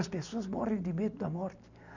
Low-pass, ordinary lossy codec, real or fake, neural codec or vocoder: 7.2 kHz; none; real; none